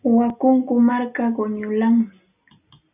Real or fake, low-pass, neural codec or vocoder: real; 3.6 kHz; none